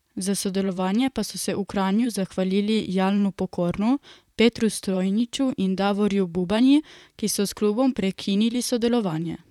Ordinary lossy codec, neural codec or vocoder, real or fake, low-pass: none; vocoder, 44.1 kHz, 128 mel bands, Pupu-Vocoder; fake; 19.8 kHz